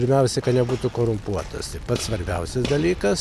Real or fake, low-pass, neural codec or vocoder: real; 14.4 kHz; none